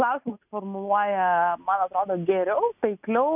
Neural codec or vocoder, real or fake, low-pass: none; real; 3.6 kHz